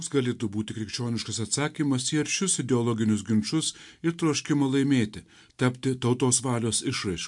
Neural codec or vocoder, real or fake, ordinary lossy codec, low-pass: none; real; MP3, 64 kbps; 10.8 kHz